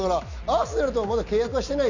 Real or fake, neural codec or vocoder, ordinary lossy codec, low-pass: real; none; none; 7.2 kHz